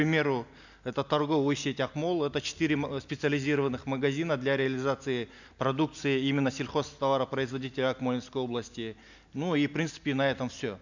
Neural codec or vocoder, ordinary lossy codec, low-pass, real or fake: none; none; 7.2 kHz; real